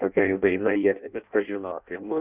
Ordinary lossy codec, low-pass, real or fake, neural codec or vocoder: Opus, 64 kbps; 3.6 kHz; fake; codec, 16 kHz in and 24 kHz out, 0.6 kbps, FireRedTTS-2 codec